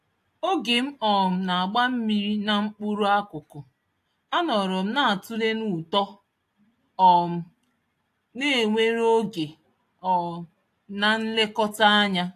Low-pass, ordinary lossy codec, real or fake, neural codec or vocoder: 14.4 kHz; AAC, 64 kbps; real; none